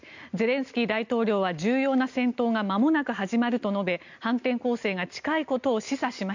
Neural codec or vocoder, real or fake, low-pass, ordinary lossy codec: none; real; 7.2 kHz; none